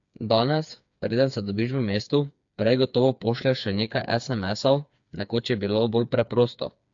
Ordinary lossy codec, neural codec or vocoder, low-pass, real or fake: AAC, 64 kbps; codec, 16 kHz, 4 kbps, FreqCodec, smaller model; 7.2 kHz; fake